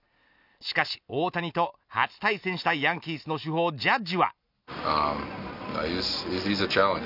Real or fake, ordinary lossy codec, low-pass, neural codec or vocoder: real; none; 5.4 kHz; none